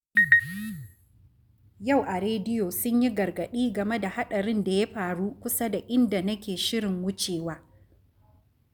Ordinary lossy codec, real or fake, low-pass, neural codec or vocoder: none; real; none; none